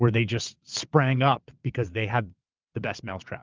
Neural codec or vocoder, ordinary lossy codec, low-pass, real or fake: vocoder, 22.05 kHz, 80 mel bands, WaveNeXt; Opus, 24 kbps; 7.2 kHz; fake